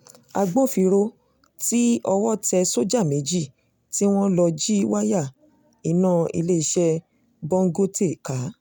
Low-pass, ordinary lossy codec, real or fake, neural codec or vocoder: none; none; real; none